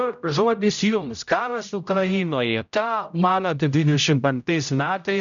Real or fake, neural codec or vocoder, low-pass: fake; codec, 16 kHz, 0.5 kbps, X-Codec, HuBERT features, trained on general audio; 7.2 kHz